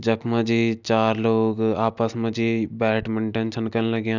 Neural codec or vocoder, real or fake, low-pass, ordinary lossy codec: none; real; 7.2 kHz; none